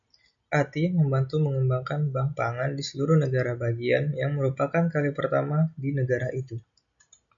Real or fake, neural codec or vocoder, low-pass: real; none; 7.2 kHz